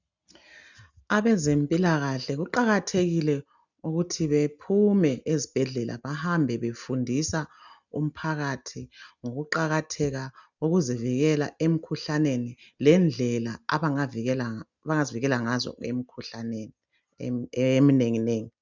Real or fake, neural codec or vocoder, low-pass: real; none; 7.2 kHz